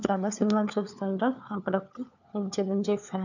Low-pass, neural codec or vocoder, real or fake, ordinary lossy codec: 7.2 kHz; codec, 16 kHz, 4 kbps, FunCodec, trained on LibriTTS, 50 frames a second; fake; none